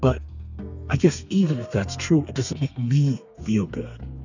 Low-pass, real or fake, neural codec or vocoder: 7.2 kHz; fake; codec, 32 kHz, 1.9 kbps, SNAC